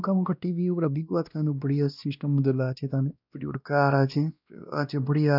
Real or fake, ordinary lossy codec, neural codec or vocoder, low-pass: fake; none; codec, 16 kHz, 1 kbps, X-Codec, WavLM features, trained on Multilingual LibriSpeech; 5.4 kHz